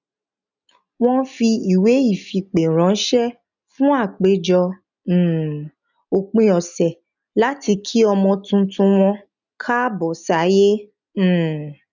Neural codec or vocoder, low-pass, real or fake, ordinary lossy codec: none; 7.2 kHz; real; none